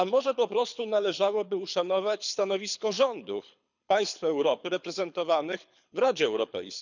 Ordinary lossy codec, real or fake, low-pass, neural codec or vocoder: none; fake; 7.2 kHz; codec, 24 kHz, 6 kbps, HILCodec